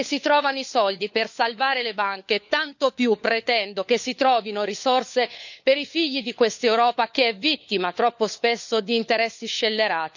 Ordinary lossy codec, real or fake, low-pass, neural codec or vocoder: none; fake; 7.2 kHz; codec, 16 kHz, 4 kbps, FunCodec, trained on LibriTTS, 50 frames a second